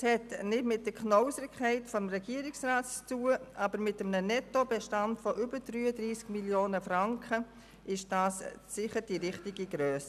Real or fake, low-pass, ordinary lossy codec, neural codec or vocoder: real; 14.4 kHz; none; none